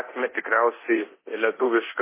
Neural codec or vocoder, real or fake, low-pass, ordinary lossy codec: codec, 24 kHz, 0.9 kbps, DualCodec; fake; 3.6 kHz; MP3, 16 kbps